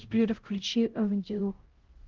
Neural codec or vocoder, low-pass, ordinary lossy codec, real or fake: codec, 16 kHz, 0.5 kbps, X-Codec, HuBERT features, trained on LibriSpeech; 7.2 kHz; Opus, 16 kbps; fake